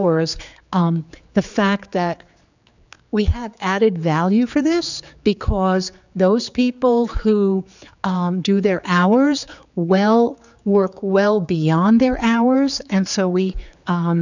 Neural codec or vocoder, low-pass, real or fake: codec, 16 kHz, 4 kbps, X-Codec, HuBERT features, trained on general audio; 7.2 kHz; fake